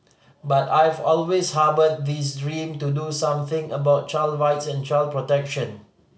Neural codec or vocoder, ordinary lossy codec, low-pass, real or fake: none; none; none; real